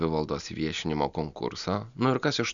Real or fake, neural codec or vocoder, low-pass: real; none; 7.2 kHz